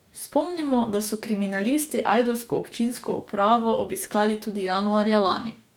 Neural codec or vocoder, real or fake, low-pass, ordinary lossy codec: codec, 44.1 kHz, 2.6 kbps, DAC; fake; 19.8 kHz; none